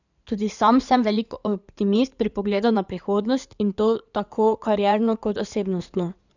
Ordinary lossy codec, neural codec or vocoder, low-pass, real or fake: none; codec, 16 kHz in and 24 kHz out, 2.2 kbps, FireRedTTS-2 codec; 7.2 kHz; fake